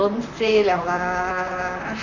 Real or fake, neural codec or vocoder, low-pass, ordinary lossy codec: fake; vocoder, 44.1 kHz, 128 mel bands, Pupu-Vocoder; 7.2 kHz; none